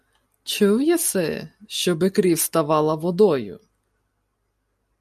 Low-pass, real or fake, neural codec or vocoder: 14.4 kHz; real; none